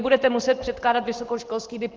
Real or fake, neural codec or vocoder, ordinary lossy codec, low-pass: real; none; Opus, 16 kbps; 7.2 kHz